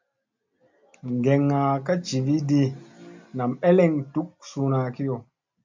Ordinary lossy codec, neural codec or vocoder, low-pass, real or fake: MP3, 64 kbps; none; 7.2 kHz; real